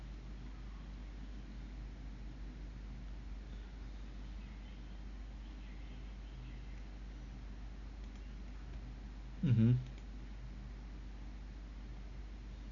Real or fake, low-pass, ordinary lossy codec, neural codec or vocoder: real; 7.2 kHz; none; none